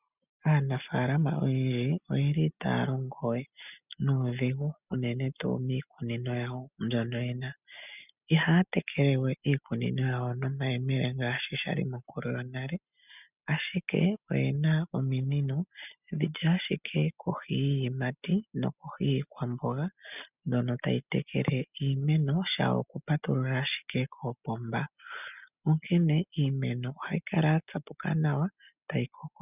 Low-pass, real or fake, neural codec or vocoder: 3.6 kHz; real; none